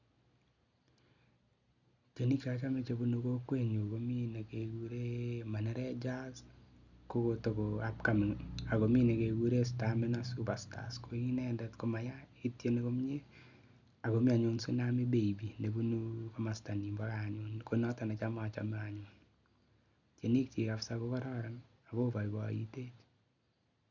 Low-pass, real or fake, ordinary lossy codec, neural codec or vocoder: 7.2 kHz; real; none; none